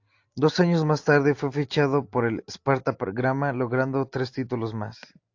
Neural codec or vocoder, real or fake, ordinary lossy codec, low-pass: none; real; AAC, 48 kbps; 7.2 kHz